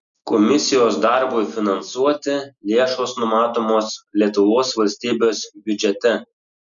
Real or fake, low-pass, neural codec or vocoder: real; 7.2 kHz; none